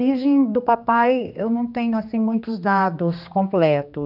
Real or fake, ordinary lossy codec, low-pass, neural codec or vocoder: fake; none; 5.4 kHz; codec, 16 kHz, 2 kbps, X-Codec, HuBERT features, trained on general audio